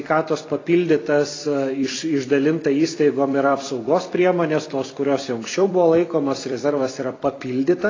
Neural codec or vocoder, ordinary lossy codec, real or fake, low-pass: vocoder, 44.1 kHz, 128 mel bands every 256 samples, BigVGAN v2; AAC, 32 kbps; fake; 7.2 kHz